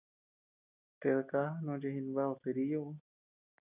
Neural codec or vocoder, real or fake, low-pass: none; real; 3.6 kHz